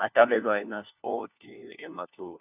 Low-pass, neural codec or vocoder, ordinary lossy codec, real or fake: 3.6 kHz; codec, 16 kHz, 1 kbps, FunCodec, trained on LibriTTS, 50 frames a second; none; fake